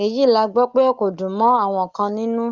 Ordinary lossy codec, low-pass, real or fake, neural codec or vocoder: Opus, 32 kbps; 7.2 kHz; real; none